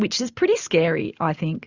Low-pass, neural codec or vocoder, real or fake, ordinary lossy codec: 7.2 kHz; none; real; Opus, 64 kbps